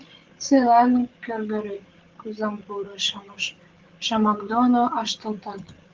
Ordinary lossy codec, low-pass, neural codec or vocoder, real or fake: Opus, 16 kbps; 7.2 kHz; codec, 16 kHz, 8 kbps, FreqCodec, larger model; fake